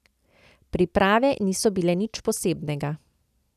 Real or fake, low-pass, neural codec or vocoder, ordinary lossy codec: real; 14.4 kHz; none; none